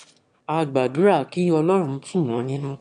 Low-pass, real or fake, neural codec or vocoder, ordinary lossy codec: 9.9 kHz; fake; autoencoder, 22.05 kHz, a latent of 192 numbers a frame, VITS, trained on one speaker; none